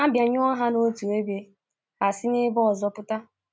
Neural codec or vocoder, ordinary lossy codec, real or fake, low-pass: none; none; real; none